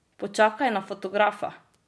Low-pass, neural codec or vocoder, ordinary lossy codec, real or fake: none; none; none; real